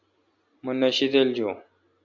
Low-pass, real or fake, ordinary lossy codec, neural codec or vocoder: 7.2 kHz; real; MP3, 64 kbps; none